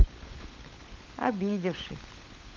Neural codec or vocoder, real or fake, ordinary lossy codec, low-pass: codec, 16 kHz, 16 kbps, FunCodec, trained on LibriTTS, 50 frames a second; fake; Opus, 32 kbps; 7.2 kHz